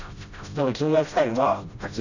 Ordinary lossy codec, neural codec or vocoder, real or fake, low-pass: none; codec, 16 kHz, 0.5 kbps, FreqCodec, smaller model; fake; 7.2 kHz